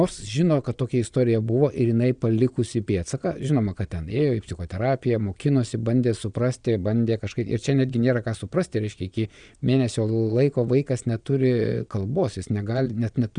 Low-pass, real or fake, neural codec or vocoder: 9.9 kHz; fake; vocoder, 22.05 kHz, 80 mel bands, Vocos